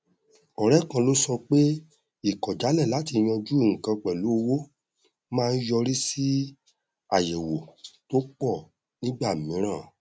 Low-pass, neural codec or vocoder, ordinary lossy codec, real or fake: none; none; none; real